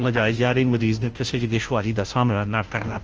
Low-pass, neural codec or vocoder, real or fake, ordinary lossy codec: 7.2 kHz; codec, 16 kHz, 0.5 kbps, FunCodec, trained on Chinese and English, 25 frames a second; fake; Opus, 24 kbps